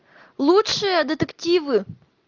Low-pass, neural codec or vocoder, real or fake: 7.2 kHz; none; real